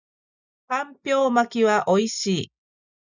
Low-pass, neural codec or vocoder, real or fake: 7.2 kHz; none; real